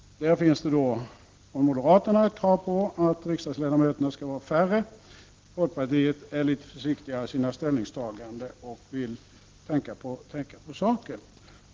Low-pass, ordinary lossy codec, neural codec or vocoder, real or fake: 7.2 kHz; Opus, 16 kbps; none; real